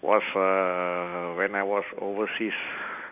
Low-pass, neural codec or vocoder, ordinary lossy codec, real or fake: 3.6 kHz; none; none; real